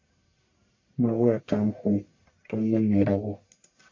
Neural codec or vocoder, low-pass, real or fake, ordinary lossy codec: codec, 44.1 kHz, 1.7 kbps, Pupu-Codec; 7.2 kHz; fake; MP3, 48 kbps